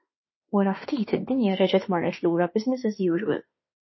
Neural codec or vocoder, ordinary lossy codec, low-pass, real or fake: autoencoder, 48 kHz, 32 numbers a frame, DAC-VAE, trained on Japanese speech; MP3, 24 kbps; 7.2 kHz; fake